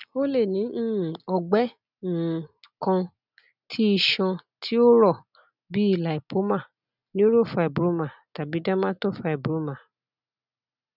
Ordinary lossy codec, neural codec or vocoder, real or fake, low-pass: none; none; real; 5.4 kHz